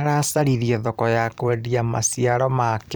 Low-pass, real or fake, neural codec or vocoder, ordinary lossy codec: none; fake; vocoder, 44.1 kHz, 128 mel bands every 256 samples, BigVGAN v2; none